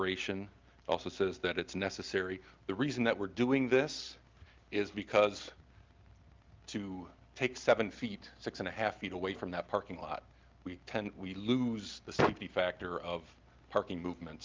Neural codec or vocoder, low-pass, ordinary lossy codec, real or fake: none; 7.2 kHz; Opus, 24 kbps; real